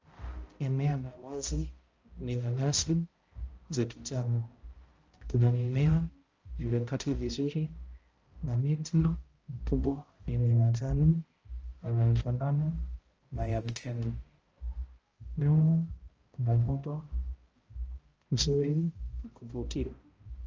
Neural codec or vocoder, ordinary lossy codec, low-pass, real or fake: codec, 16 kHz, 0.5 kbps, X-Codec, HuBERT features, trained on balanced general audio; Opus, 24 kbps; 7.2 kHz; fake